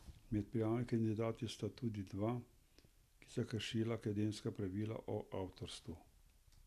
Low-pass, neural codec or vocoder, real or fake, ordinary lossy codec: 14.4 kHz; none; real; none